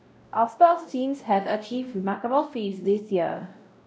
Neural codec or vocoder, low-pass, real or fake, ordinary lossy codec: codec, 16 kHz, 0.5 kbps, X-Codec, WavLM features, trained on Multilingual LibriSpeech; none; fake; none